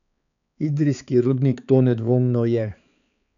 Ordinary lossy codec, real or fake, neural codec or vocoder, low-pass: none; fake; codec, 16 kHz, 4 kbps, X-Codec, HuBERT features, trained on balanced general audio; 7.2 kHz